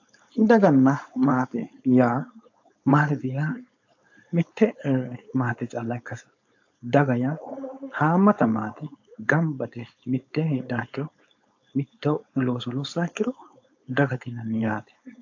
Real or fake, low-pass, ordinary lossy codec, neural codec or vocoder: fake; 7.2 kHz; AAC, 48 kbps; codec, 16 kHz, 4.8 kbps, FACodec